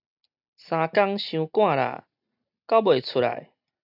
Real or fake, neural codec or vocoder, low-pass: real; none; 5.4 kHz